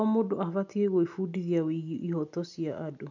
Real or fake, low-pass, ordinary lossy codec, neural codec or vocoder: real; 7.2 kHz; none; none